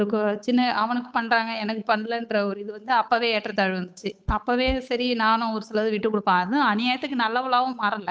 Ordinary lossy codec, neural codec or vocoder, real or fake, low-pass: none; codec, 16 kHz, 8 kbps, FunCodec, trained on Chinese and English, 25 frames a second; fake; none